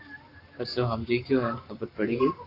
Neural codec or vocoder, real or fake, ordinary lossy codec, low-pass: codec, 44.1 kHz, 7.8 kbps, Pupu-Codec; fake; AAC, 32 kbps; 5.4 kHz